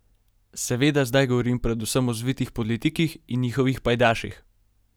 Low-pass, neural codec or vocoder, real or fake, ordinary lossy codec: none; none; real; none